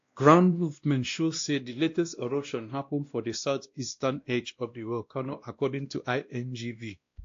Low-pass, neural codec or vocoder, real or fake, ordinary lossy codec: 7.2 kHz; codec, 16 kHz, 1 kbps, X-Codec, WavLM features, trained on Multilingual LibriSpeech; fake; AAC, 48 kbps